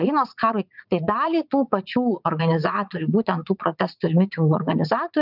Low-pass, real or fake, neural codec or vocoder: 5.4 kHz; fake; vocoder, 44.1 kHz, 80 mel bands, Vocos